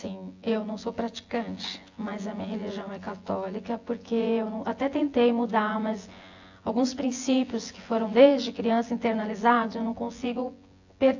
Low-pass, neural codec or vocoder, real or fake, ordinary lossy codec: 7.2 kHz; vocoder, 24 kHz, 100 mel bands, Vocos; fake; AAC, 48 kbps